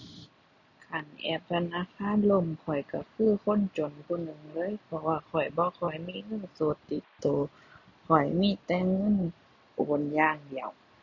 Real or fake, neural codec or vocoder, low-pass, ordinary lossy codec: real; none; 7.2 kHz; none